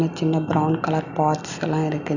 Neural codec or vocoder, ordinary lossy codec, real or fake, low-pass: none; none; real; 7.2 kHz